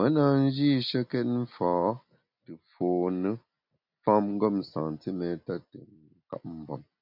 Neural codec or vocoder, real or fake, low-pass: none; real; 5.4 kHz